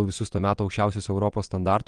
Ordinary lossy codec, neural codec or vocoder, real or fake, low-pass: Opus, 24 kbps; vocoder, 22.05 kHz, 80 mel bands, WaveNeXt; fake; 9.9 kHz